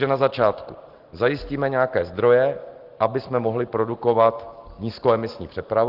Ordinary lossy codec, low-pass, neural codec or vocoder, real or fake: Opus, 16 kbps; 5.4 kHz; none; real